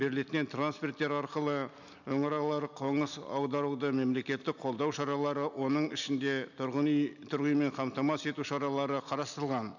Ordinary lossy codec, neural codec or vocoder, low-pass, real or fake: none; none; 7.2 kHz; real